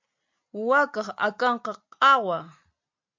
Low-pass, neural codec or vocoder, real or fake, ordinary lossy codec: 7.2 kHz; none; real; MP3, 64 kbps